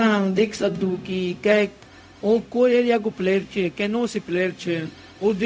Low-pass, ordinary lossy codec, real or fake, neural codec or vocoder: none; none; fake; codec, 16 kHz, 0.4 kbps, LongCat-Audio-Codec